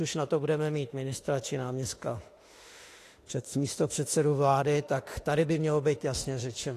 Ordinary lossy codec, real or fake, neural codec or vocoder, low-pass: AAC, 48 kbps; fake; autoencoder, 48 kHz, 32 numbers a frame, DAC-VAE, trained on Japanese speech; 14.4 kHz